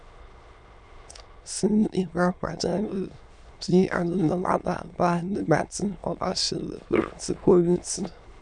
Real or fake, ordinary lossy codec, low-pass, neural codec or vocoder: fake; none; 9.9 kHz; autoencoder, 22.05 kHz, a latent of 192 numbers a frame, VITS, trained on many speakers